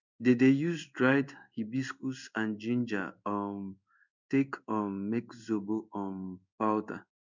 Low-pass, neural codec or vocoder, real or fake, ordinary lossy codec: 7.2 kHz; codec, 16 kHz in and 24 kHz out, 1 kbps, XY-Tokenizer; fake; none